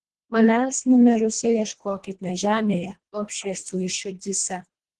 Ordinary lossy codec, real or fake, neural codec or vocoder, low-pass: Opus, 16 kbps; fake; codec, 24 kHz, 1.5 kbps, HILCodec; 10.8 kHz